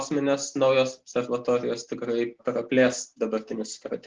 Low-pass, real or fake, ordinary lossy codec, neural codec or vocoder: 7.2 kHz; real; Opus, 16 kbps; none